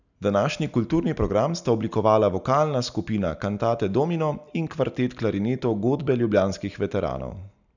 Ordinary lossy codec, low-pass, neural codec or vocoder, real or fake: none; 7.2 kHz; none; real